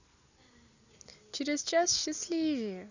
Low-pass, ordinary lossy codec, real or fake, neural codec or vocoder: 7.2 kHz; none; real; none